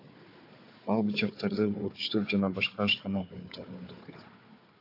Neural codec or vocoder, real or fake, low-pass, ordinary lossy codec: codec, 16 kHz, 4 kbps, FunCodec, trained on Chinese and English, 50 frames a second; fake; 5.4 kHz; AAC, 32 kbps